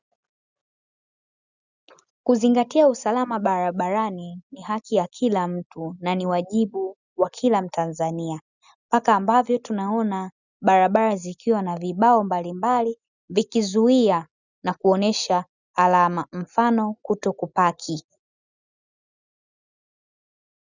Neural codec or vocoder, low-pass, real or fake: none; 7.2 kHz; real